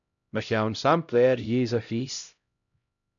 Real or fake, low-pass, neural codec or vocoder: fake; 7.2 kHz; codec, 16 kHz, 0.5 kbps, X-Codec, HuBERT features, trained on LibriSpeech